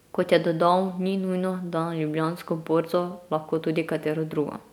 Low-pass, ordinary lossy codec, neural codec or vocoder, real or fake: 19.8 kHz; none; none; real